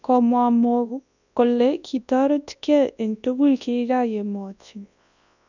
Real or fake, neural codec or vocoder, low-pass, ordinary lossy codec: fake; codec, 24 kHz, 0.9 kbps, WavTokenizer, large speech release; 7.2 kHz; none